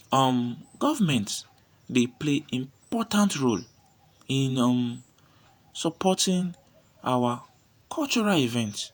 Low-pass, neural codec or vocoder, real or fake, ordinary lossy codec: none; vocoder, 48 kHz, 128 mel bands, Vocos; fake; none